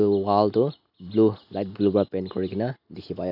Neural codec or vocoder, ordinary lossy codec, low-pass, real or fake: none; none; 5.4 kHz; real